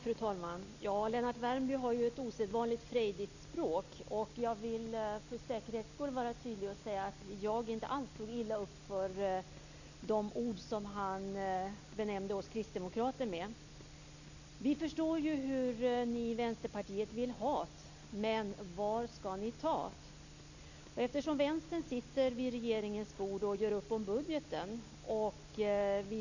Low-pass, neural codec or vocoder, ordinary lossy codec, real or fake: 7.2 kHz; none; none; real